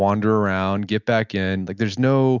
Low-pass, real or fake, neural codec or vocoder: 7.2 kHz; real; none